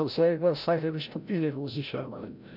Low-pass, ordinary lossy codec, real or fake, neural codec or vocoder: 5.4 kHz; none; fake; codec, 16 kHz, 0.5 kbps, FreqCodec, larger model